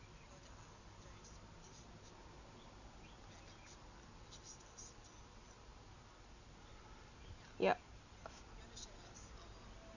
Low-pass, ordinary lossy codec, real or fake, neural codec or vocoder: 7.2 kHz; none; real; none